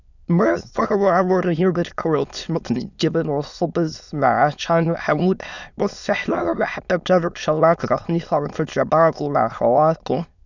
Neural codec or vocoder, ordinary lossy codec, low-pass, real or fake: autoencoder, 22.05 kHz, a latent of 192 numbers a frame, VITS, trained on many speakers; none; 7.2 kHz; fake